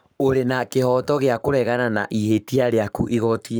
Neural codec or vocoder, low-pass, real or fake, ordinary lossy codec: codec, 44.1 kHz, 7.8 kbps, Pupu-Codec; none; fake; none